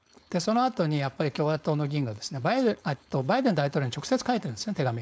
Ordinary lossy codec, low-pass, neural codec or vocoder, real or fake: none; none; codec, 16 kHz, 4.8 kbps, FACodec; fake